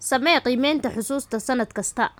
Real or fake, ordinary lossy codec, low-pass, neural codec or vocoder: real; none; none; none